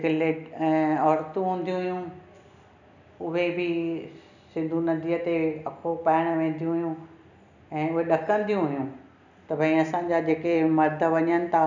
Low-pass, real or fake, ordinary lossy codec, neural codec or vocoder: 7.2 kHz; real; none; none